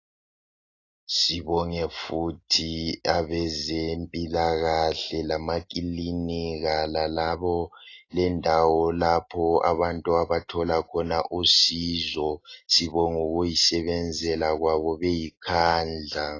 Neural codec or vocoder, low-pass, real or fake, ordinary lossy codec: none; 7.2 kHz; real; AAC, 32 kbps